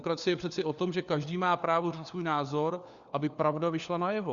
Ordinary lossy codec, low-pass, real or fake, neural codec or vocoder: Opus, 64 kbps; 7.2 kHz; fake; codec, 16 kHz, 4 kbps, FunCodec, trained on LibriTTS, 50 frames a second